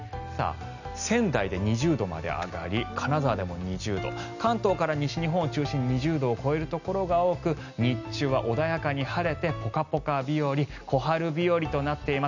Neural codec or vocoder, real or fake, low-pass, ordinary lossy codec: none; real; 7.2 kHz; none